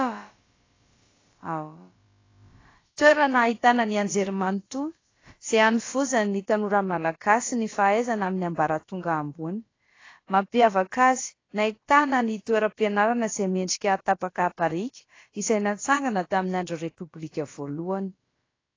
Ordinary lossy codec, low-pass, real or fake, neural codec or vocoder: AAC, 32 kbps; 7.2 kHz; fake; codec, 16 kHz, about 1 kbps, DyCAST, with the encoder's durations